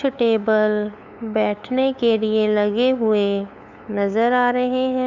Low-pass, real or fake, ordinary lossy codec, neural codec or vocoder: 7.2 kHz; fake; none; codec, 16 kHz, 8 kbps, FunCodec, trained on LibriTTS, 25 frames a second